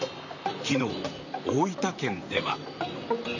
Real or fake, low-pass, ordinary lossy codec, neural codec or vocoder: fake; 7.2 kHz; none; vocoder, 44.1 kHz, 128 mel bands, Pupu-Vocoder